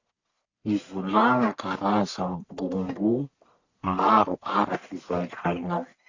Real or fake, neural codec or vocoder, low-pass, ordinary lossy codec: fake; codec, 44.1 kHz, 1.7 kbps, Pupu-Codec; 7.2 kHz; Opus, 64 kbps